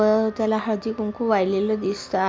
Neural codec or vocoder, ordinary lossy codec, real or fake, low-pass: none; none; real; none